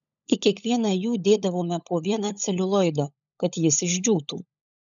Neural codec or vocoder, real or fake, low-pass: codec, 16 kHz, 16 kbps, FunCodec, trained on LibriTTS, 50 frames a second; fake; 7.2 kHz